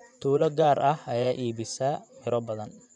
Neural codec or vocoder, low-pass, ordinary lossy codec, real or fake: vocoder, 24 kHz, 100 mel bands, Vocos; 10.8 kHz; none; fake